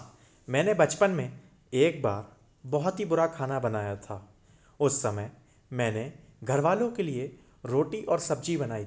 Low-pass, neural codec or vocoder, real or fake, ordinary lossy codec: none; none; real; none